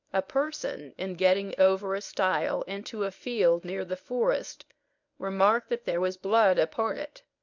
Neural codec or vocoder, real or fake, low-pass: codec, 24 kHz, 0.9 kbps, WavTokenizer, medium speech release version 1; fake; 7.2 kHz